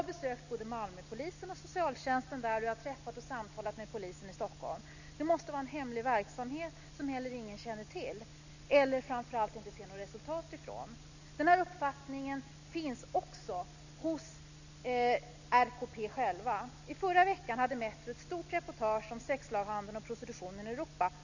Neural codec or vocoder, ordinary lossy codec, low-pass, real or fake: none; none; 7.2 kHz; real